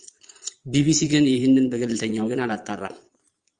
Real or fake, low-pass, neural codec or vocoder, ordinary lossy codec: fake; 9.9 kHz; vocoder, 22.05 kHz, 80 mel bands, Vocos; Opus, 32 kbps